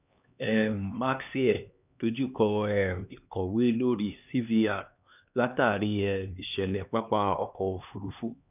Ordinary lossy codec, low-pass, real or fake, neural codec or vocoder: none; 3.6 kHz; fake; codec, 16 kHz, 2 kbps, X-Codec, HuBERT features, trained on LibriSpeech